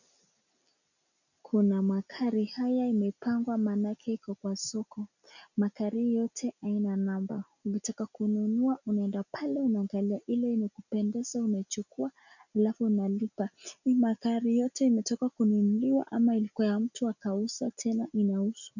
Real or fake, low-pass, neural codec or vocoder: real; 7.2 kHz; none